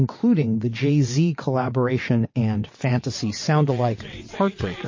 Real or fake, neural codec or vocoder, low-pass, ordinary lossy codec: fake; vocoder, 22.05 kHz, 80 mel bands, WaveNeXt; 7.2 kHz; MP3, 32 kbps